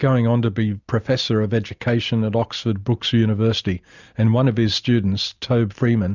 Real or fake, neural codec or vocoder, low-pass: real; none; 7.2 kHz